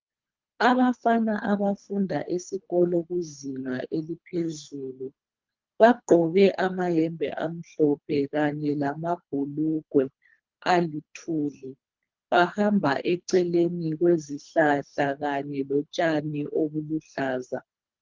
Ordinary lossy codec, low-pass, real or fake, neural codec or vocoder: Opus, 24 kbps; 7.2 kHz; fake; codec, 24 kHz, 3 kbps, HILCodec